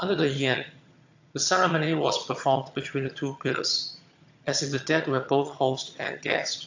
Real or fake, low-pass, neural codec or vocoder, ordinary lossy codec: fake; 7.2 kHz; vocoder, 22.05 kHz, 80 mel bands, HiFi-GAN; AAC, 48 kbps